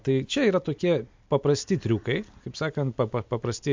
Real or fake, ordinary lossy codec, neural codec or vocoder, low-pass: real; MP3, 64 kbps; none; 7.2 kHz